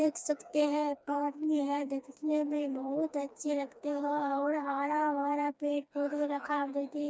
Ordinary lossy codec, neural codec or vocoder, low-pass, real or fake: none; codec, 16 kHz, 2 kbps, FreqCodec, smaller model; none; fake